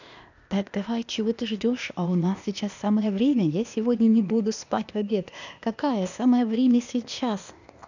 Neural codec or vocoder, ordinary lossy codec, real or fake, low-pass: codec, 16 kHz, 0.8 kbps, ZipCodec; none; fake; 7.2 kHz